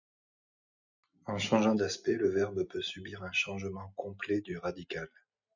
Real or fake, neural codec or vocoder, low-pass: real; none; 7.2 kHz